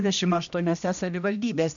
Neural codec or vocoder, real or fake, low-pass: codec, 16 kHz, 1 kbps, X-Codec, HuBERT features, trained on general audio; fake; 7.2 kHz